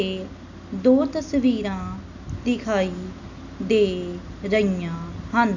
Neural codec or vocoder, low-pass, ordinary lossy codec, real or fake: none; 7.2 kHz; none; real